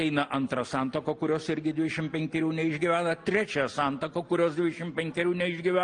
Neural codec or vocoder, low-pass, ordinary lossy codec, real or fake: none; 9.9 kHz; Opus, 32 kbps; real